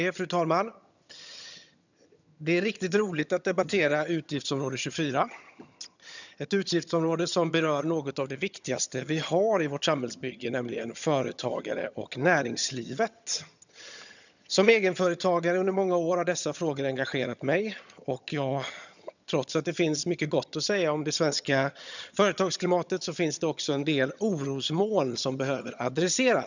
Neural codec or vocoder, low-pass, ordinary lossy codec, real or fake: vocoder, 22.05 kHz, 80 mel bands, HiFi-GAN; 7.2 kHz; none; fake